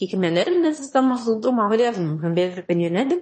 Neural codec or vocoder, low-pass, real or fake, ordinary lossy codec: autoencoder, 22.05 kHz, a latent of 192 numbers a frame, VITS, trained on one speaker; 9.9 kHz; fake; MP3, 32 kbps